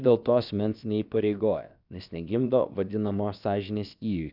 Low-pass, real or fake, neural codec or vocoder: 5.4 kHz; fake; codec, 16 kHz, about 1 kbps, DyCAST, with the encoder's durations